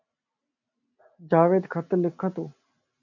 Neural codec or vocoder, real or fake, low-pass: none; real; 7.2 kHz